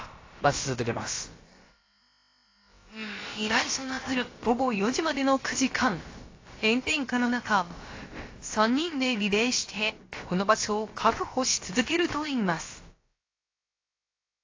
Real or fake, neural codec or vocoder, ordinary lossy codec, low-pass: fake; codec, 16 kHz, about 1 kbps, DyCAST, with the encoder's durations; AAC, 32 kbps; 7.2 kHz